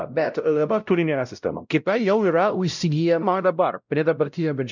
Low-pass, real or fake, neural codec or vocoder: 7.2 kHz; fake; codec, 16 kHz, 0.5 kbps, X-Codec, WavLM features, trained on Multilingual LibriSpeech